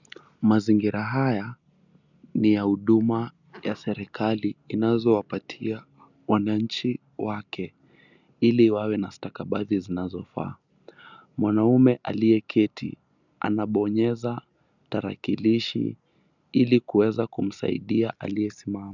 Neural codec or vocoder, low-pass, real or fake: none; 7.2 kHz; real